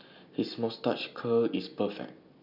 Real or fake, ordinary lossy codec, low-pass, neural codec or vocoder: real; none; 5.4 kHz; none